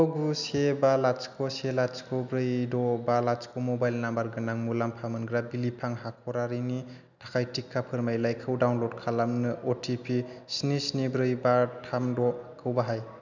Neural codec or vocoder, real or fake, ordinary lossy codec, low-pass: none; real; none; 7.2 kHz